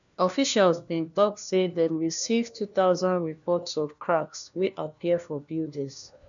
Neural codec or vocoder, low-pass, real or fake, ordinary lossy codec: codec, 16 kHz, 1 kbps, FunCodec, trained on LibriTTS, 50 frames a second; 7.2 kHz; fake; none